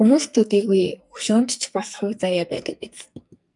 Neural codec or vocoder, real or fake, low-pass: codec, 44.1 kHz, 2.6 kbps, SNAC; fake; 10.8 kHz